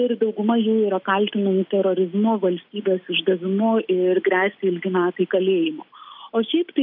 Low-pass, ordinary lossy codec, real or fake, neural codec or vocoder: 5.4 kHz; AAC, 48 kbps; real; none